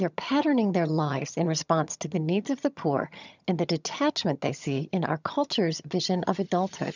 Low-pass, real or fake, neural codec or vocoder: 7.2 kHz; fake; vocoder, 22.05 kHz, 80 mel bands, HiFi-GAN